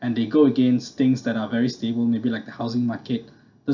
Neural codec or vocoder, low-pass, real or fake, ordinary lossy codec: none; 7.2 kHz; real; none